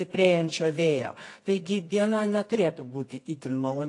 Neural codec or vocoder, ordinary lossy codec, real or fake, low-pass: codec, 24 kHz, 0.9 kbps, WavTokenizer, medium music audio release; AAC, 48 kbps; fake; 10.8 kHz